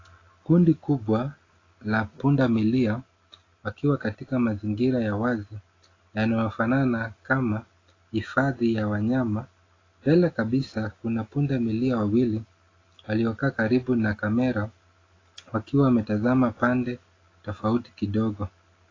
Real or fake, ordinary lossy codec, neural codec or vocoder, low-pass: real; AAC, 32 kbps; none; 7.2 kHz